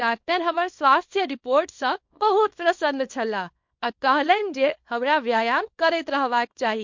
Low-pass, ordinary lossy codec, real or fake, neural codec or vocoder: 7.2 kHz; MP3, 48 kbps; fake; codec, 24 kHz, 0.9 kbps, WavTokenizer, small release